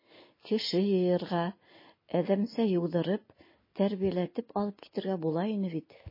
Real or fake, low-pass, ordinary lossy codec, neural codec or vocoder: real; 5.4 kHz; MP3, 24 kbps; none